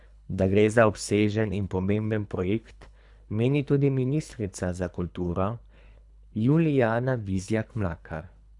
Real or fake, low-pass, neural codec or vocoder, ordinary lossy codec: fake; 10.8 kHz; codec, 24 kHz, 3 kbps, HILCodec; none